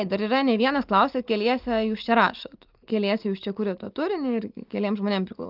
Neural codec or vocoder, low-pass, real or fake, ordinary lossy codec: none; 5.4 kHz; real; Opus, 24 kbps